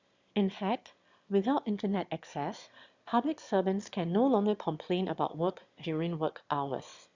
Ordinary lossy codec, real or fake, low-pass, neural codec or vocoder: Opus, 64 kbps; fake; 7.2 kHz; autoencoder, 22.05 kHz, a latent of 192 numbers a frame, VITS, trained on one speaker